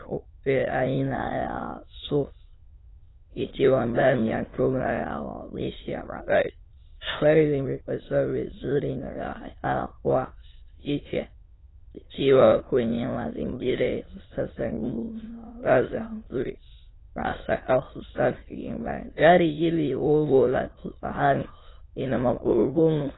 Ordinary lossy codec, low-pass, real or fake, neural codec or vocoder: AAC, 16 kbps; 7.2 kHz; fake; autoencoder, 22.05 kHz, a latent of 192 numbers a frame, VITS, trained on many speakers